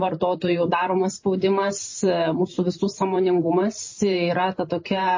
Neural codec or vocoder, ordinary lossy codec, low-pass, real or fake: vocoder, 44.1 kHz, 128 mel bands every 512 samples, BigVGAN v2; MP3, 32 kbps; 7.2 kHz; fake